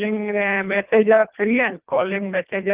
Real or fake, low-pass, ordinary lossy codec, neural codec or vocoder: fake; 3.6 kHz; Opus, 24 kbps; codec, 24 kHz, 1.5 kbps, HILCodec